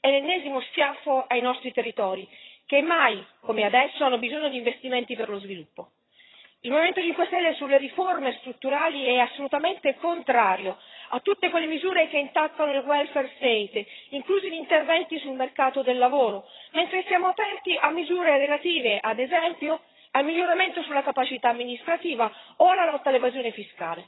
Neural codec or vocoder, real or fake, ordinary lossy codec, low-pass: vocoder, 22.05 kHz, 80 mel bands, HiFi-GAN; fake; AAC, 16 kbps; 7.2 kHz